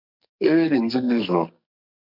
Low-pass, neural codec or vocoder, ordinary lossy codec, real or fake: 5.4 kHz; codec, 44.1 kHz, 2.6 kbps, SNAC; MP3, 48 kbps; fake